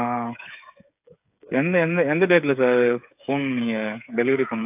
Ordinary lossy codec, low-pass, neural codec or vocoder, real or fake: AAC, 32 kbps; 3.6 kHz; codec, 16 kHz, 8 kbps, FreqCodec, smaller model; fake